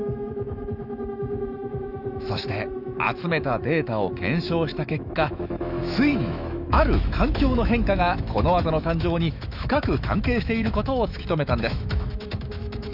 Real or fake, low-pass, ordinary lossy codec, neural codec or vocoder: fake; 5.4 kHz; none; autoencoder, 48 kHz, 128 numbers a frame, DAC-VAE, trained on Japanese speech